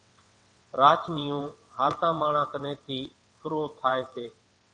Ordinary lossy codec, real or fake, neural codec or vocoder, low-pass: AAC, 48 kbps; fake; vocoder, 22.05 kHz, 80 mel bands, WaveNeXt; 9.9 kHz